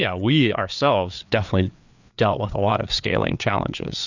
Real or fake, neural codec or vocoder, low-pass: fake; codec, 16 kHz, 4 kbps, FreqCodec, larger model; 7.2 kHz